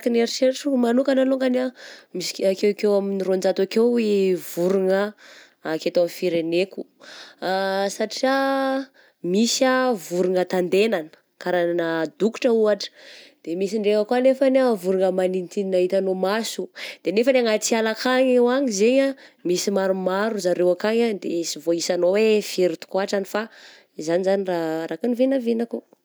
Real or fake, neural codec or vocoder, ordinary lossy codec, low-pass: real; none; none; none